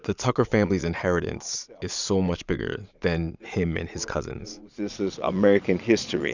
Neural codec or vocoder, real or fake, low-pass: vocoder, 22.05 kHz, 80 mel bands, Vocos; fake; 7.2 kHz